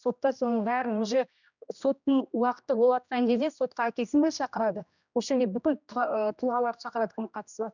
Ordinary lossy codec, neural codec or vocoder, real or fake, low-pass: none; codec, 16 kHz, 1 kbps, X-Codec, HuBERT features, trained on general audio; fake; 7.2 kHz